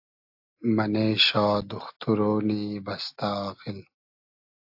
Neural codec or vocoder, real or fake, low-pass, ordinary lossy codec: none; real; 5.4 kHz; AAC, 48 kbps